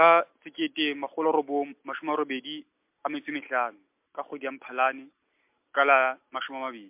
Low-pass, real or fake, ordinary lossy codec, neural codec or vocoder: 3.6 kHz; real; MP3, 32 kbps; none